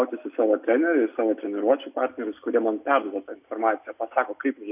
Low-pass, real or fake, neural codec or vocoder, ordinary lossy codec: 3.6 kHz; real; none; AAC, 32 kbps